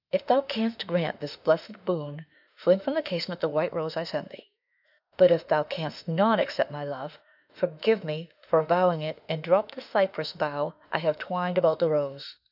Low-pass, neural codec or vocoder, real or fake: 5.4 kHz; autoencoder, 48 kHz, 32 numbers a frame, DAC-VAE, trained on Japanese speech; fake